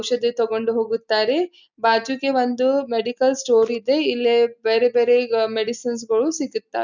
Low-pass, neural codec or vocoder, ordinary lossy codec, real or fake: 7.2 kHz; none; none; real